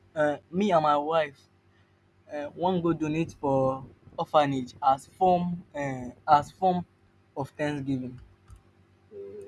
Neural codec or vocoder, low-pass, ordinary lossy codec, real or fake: none; none; none; real